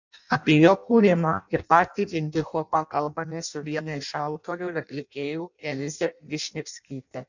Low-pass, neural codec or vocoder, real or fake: 7.2 kHz; codec, 16 kHz in and 24 kHz out, 0.6 kbps, FireRedTTS-2 codec; fake